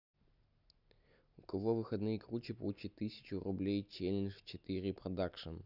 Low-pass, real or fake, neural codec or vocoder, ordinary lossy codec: 5.4 kHz; real; none; none